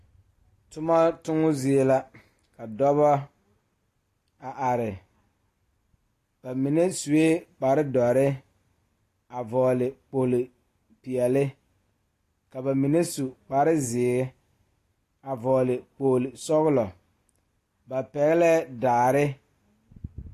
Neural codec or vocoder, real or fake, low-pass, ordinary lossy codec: none; real; 14.4 kHz; AAC, 48 kbps